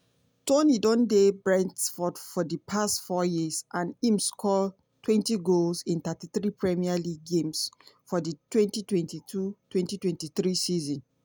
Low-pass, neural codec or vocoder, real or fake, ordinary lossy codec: none; none; real; none